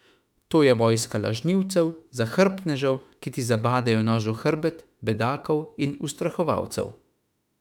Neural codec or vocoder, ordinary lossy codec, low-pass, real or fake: autoencoder, 48 kHz, 32 numbers a frame, DAC-VAE, trained on Japanese speech; none; 19.8 kHz; fake